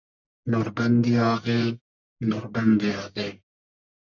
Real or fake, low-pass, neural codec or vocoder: fake; 7.2 kHz; codec, 44.1 kHz, 1.7 kbps, Pupu-Codec